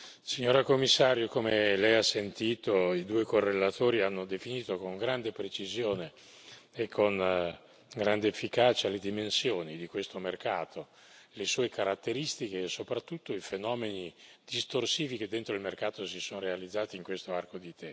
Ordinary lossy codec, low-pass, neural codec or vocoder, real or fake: none; none; none; real